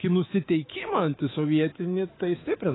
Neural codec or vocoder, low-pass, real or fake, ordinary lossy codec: codec, 24 kHz, 3.1 kbps, DualCodec; 7.2 kHz; fake; AAC, 16 kbps